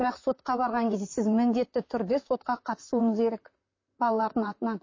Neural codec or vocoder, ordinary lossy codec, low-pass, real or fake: vocoder, 44.1 kHz, 128 mel bands, Pupu-Vocoder; MP3, 32 kbps; 7.2 kHz; fake